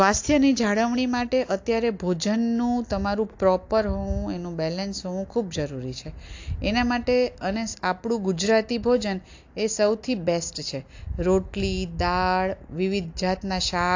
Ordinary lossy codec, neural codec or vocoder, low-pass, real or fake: AAC, 48 kbps; none; 7.2 kHz; real